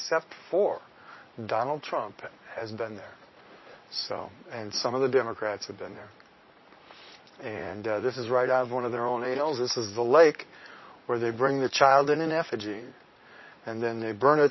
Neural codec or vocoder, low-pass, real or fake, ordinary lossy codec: vocoder, 44.1 kHz, 80 mel bands, Vocos; 7.2 kHz; fake; MP3, 24 kbps